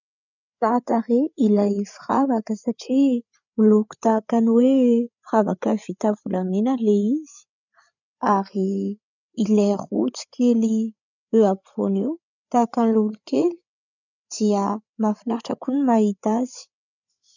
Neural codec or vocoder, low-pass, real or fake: codec, 16 kHz, 8 kbps, FreqCodec, larger model; 7.2 kHz; fake